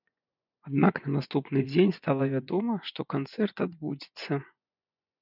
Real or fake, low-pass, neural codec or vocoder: fake; 5.4 kHz; vocoder, 24 kHz, 100 mel bands, Vocos